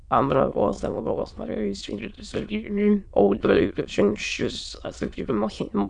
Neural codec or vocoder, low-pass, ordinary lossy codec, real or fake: autoencoder, 22.05 kHz, a latent of 192 numbers a frame, VITS, trained on many speakers; 9.9 kHz; MP3, 96 kbps; fake